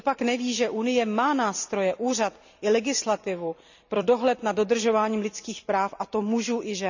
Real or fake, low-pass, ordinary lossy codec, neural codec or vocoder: fake; 7.2 kHz; none; vocoder, 44.1 kHz, 128 mel bands every 256 samples, BigVGAN v2